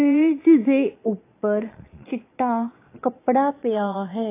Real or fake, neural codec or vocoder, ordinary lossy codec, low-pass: fake; vocoder, 22.05 kHz, 80 mel bands, Vocos; AAC, 24 kbps; 3.6 kHz